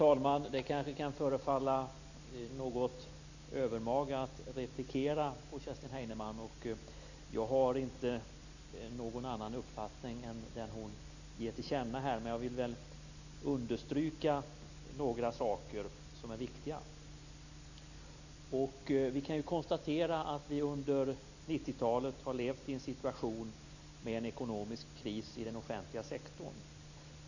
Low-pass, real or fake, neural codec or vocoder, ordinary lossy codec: 7.2 kHz; real; none; none